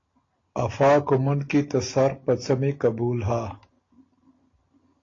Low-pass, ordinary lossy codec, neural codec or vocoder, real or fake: 7.2 kHz; AAC, 32 kbps; none; real